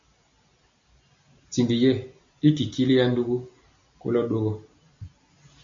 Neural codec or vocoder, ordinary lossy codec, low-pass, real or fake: none; MP3, 64 kbps; 7.2 kHz; real